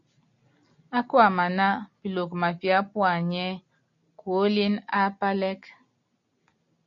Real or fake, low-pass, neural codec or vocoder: real; 7.2 kHz; none